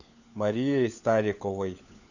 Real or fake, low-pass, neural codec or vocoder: fake; 7.2 kHz; codec, 16 kHz, 4 kbps, FunCodec, trained on LibriTTS, 50 frames a second